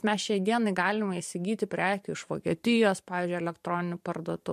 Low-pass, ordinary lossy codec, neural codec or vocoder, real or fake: 14.4 kHz; MP3, 64 kbps; vocoder, 44.1 kHz, 128 mel bands every 512 samples, BigVGAN v2; fake